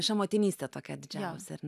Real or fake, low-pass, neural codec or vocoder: real; 14.4 kHz; none